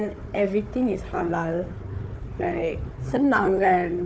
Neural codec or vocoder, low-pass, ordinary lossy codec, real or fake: codec, 16 kHz, 4 kbps, FunCodec, trained on Chinese and English, 50 frames a second; none; none; fake